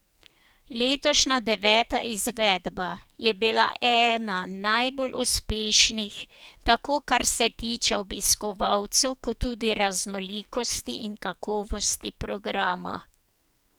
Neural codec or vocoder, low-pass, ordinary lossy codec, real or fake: codec, 44.1 kHz, 2.6 kbps, SNAC; none; none; fake